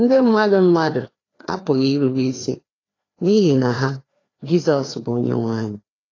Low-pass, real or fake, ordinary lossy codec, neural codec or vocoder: 7.2 kHz; fake; AAC, 32 kbps; codec, 16 kHz, 2 kbps, FreqCodec, larger model